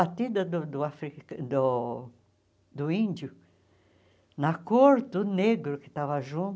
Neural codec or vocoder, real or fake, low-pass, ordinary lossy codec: none; real; none; none